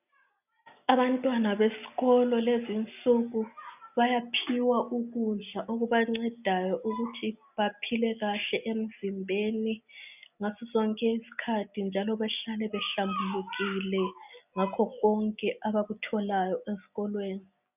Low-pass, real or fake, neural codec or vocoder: 3.6 kHz; real; none